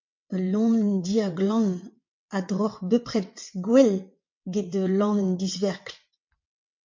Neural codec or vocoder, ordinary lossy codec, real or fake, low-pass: vocoder, 22.05 kHz, 80 mel bands, WaveNeXt; MP3, 48 kbps; fake; 7.2 kHz